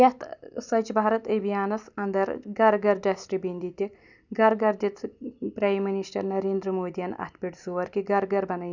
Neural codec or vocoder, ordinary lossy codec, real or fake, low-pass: none; none; real; 7.2 kHz